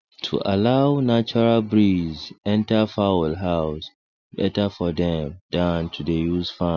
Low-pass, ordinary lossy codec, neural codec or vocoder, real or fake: 7.2 kHz; none; none; real